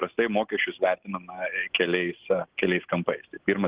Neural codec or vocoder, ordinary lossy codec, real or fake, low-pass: none; Opus, 16 kbps; real; 3.6 kHz